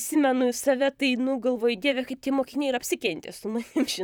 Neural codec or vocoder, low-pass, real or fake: vocoder, 44.1 kHz, 128 mel bands, Pupu-Vocoder; 19.8 kHz; fake